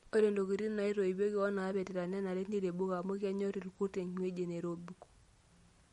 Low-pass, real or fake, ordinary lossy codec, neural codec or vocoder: 19.8 kHz; real; MP3, 48 kbps; none